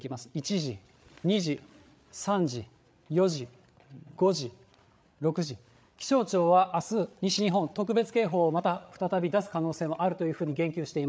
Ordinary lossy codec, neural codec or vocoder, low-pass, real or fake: none; codec, 16 kHz, 8 kbps, FreqCodec, larger model; none; fake